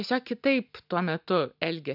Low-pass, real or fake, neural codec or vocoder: 5.4 kHz; real; none